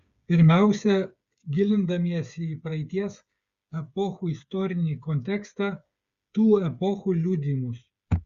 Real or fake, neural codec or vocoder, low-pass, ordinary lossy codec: fake; codec, 16 kHz, 8 kbps, FreqCodec, smaller model; 7.2 kHz; Opus, 64 kbps